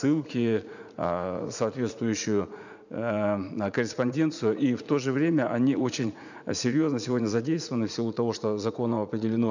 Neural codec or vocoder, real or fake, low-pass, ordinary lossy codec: vocoder, 44.1 kHz, 80 mel bands, Vocos; fake; 7.2 kHz; none